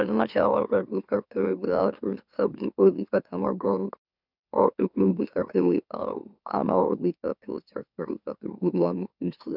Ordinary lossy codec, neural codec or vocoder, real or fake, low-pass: none; autoencoder, 44.1 kHz, a latent of 192 numbers a frame, MeloTTS; fake; 5.4 kHz